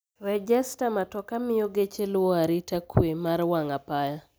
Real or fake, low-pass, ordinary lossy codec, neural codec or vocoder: real; none; none; none